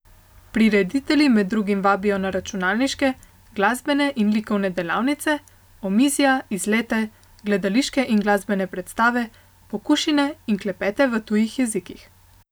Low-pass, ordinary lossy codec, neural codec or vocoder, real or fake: none; none; none; real